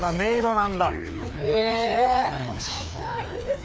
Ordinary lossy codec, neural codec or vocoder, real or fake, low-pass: none; codec, 16 kHz, 2 kbps, FreqCodec, larger model; fake; none